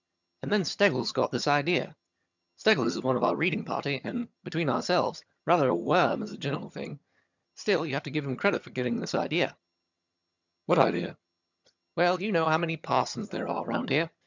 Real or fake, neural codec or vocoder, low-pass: fake; vocoder, 22.05 kHz, 80 mel bands, HiFi-GAN; 7.2 kHz